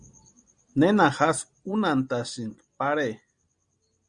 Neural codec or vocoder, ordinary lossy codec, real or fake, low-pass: none; Opus, 64 kbps; real; 9.9 kHz